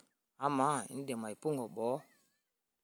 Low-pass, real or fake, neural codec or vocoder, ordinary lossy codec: none; real; none; none